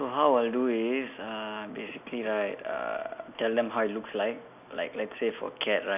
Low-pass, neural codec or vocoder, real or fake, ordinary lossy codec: 3.6 kHz; none; real; none